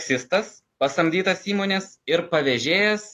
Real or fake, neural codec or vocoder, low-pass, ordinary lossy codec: real; none; 14.4 kHz; AAC, 48 kbps